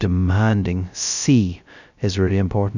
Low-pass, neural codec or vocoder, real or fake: 7.2 kHz; codec, 16 kHz, 0.2 kbps, FocalCodec; fake